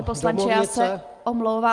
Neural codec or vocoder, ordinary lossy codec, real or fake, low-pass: none; Opus, 32 kbps; real; 10.8 kHz